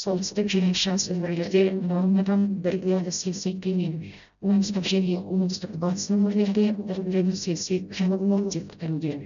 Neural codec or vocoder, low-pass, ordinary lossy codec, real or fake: codec, 16 kHz, 0.5 kbps, FreqCodec, smaller model; 7.2 kHz; none; fake